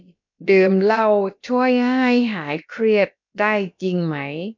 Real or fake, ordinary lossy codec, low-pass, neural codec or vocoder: fake; MP3, 64 kbps; 7.2 kHz; codec, 16 kHz, about 1 kbps, DyCAST, with the encoder's durations